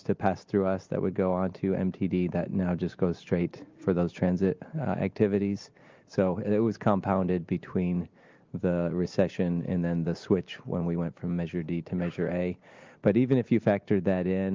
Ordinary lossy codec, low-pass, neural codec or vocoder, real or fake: Opus, 24 kbps; 7.2 kHz; none; real